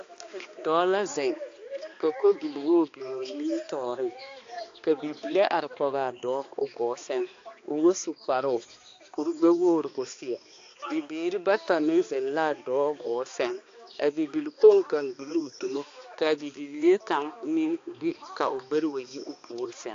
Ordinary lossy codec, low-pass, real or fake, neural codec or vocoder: AAC, 48 kbps; 7.2 kHz; fake; codec, 16 kHz, 2 kbps, X-Codec, HuBERT features, trained on balanced general audio